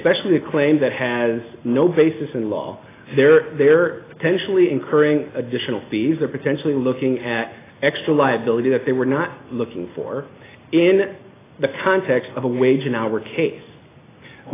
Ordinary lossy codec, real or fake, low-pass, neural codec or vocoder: AAC, 16 kbps; real; 3.6 kHz; none